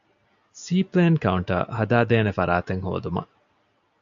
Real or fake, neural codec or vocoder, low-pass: real; none; 7.2 kHz